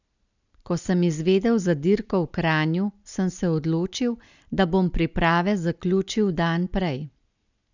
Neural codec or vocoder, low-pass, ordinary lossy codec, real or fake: none; 7.2 kHz; none; real